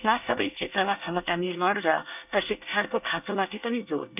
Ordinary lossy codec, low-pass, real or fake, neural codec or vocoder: none; 3.6 kHz; fake; codec, 24 kHz, 1 kbps, SNAC